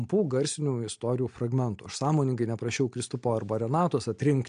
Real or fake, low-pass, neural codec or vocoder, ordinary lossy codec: real; 9.9 kHz; none; MP3, 64 kbps